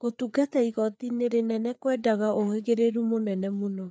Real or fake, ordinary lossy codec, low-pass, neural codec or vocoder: fake; none; none; codec, 16 kHz, 4 kbps, FreqCodec, larger model